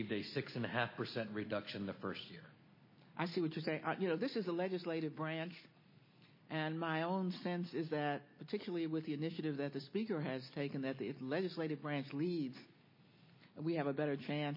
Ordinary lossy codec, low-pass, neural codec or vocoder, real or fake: MP3, 24 kbps; 5.4 kHz; none; real